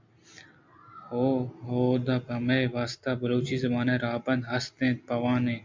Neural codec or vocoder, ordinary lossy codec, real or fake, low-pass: none; MP3, 64 kbps; real; 7.2 kHz